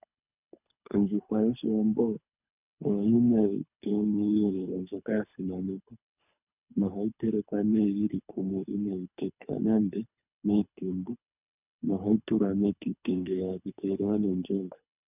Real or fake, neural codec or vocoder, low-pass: fake; codec, 24 kHz, 3 kbps, HILCodec; 3.6 kHz